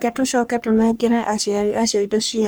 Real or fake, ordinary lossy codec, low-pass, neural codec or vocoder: fake; none; none; codec, 44.1 kHz, 3.4 kbps, Pupu-Codec